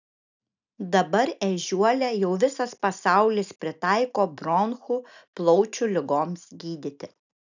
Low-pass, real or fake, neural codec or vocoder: 7.2 kHz; real; none